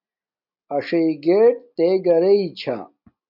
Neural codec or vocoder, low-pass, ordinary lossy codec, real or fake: none; 5.4 kHz; MP3, 48 kbps; real